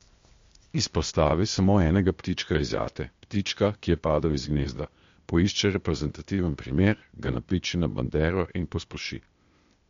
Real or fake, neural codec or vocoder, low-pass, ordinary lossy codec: fake; codec, 16 kHz, 0.8 kbps, ZipCodec; 7.2 kHz; MP3, 48 kbps